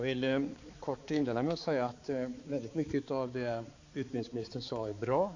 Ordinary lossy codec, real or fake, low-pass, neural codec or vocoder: AAC, 48 kbps; fake; 7.2 kHz; codec, 16 kHz, 4 kbps, X-Codec, HuBERT features, trained on balanced general audio